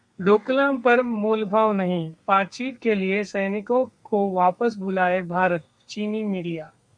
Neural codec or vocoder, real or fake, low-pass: codec, 32 kHz, 1.9 kbps, SNAC; fake; 9.9 kHz